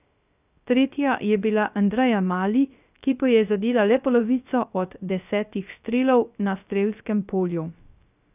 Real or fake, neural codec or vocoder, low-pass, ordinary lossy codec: fake; codec, 16 kHz, 0.3 kbps, FocalCodec; 3.6 kHz; none